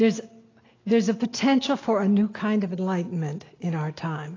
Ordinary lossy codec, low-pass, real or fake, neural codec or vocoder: AAC, 32 kbps; 7.2 kHz; real; none